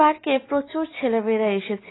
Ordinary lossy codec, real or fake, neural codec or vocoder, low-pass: AAC, 16 kbps; real; none; 7.2 kHz